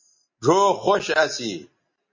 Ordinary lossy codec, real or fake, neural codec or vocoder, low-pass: MP3, 32 kbps; real; none; 7.2 kHz